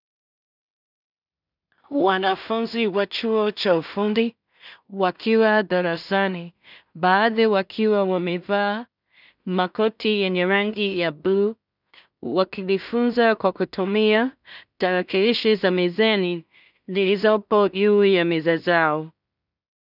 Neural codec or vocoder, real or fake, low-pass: codec, 16 kHz in and 24 kHz out, 0.4 kbps, LongCat-Audio-Codec, two codebook decoder; fake; 5.4 kHz